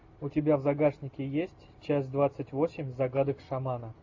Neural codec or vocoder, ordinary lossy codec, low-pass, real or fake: none; AAC, 48 kbps; 7.2 kHz; real